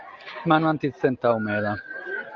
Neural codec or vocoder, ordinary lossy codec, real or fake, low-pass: none; Opus, 32 kbps; real; 7.2 kHz